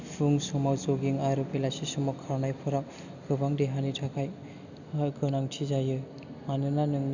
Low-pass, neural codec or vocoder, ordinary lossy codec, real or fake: 7.2 kHz; none; none; real